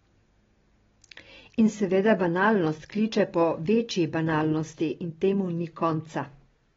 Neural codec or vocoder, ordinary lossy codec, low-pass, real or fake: none; AAC, 24 kbps; 7.2 kHz; real